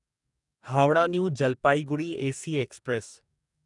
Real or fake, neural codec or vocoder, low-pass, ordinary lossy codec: fake; codec, 44.1 kHz, 2.6 kbps, DAC; 10.8 kHz; none